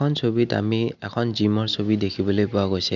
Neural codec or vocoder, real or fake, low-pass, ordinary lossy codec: none; real; 7.2 kHz; none